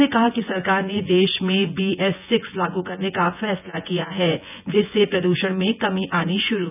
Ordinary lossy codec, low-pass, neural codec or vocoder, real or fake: none; 3.6 kHz; vocoder, 24 kHz, 100 mel bands, Vocos; fake